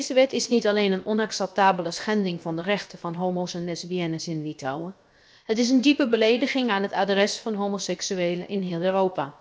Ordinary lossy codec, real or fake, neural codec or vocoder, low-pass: none; fake; codec, 16 kHz, 0.7 kbps, FocalCodec; none